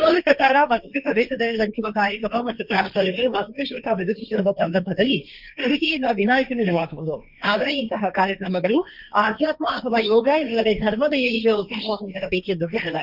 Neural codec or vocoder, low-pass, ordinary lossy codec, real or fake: codec, 16 kHz, 1.1 kbps, Voila-Tokenizer; 5.4 kHz; none; fake